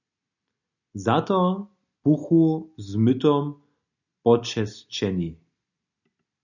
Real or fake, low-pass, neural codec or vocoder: real; 7.2 kHz; none